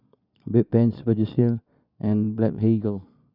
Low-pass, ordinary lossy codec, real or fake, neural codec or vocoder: 5.4 kHz; none; fake; vocoder, 22.05 kHz, 80 mel bands, Vocos